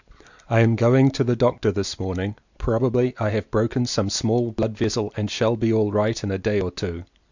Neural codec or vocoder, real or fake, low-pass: none; real; 7.2 kHz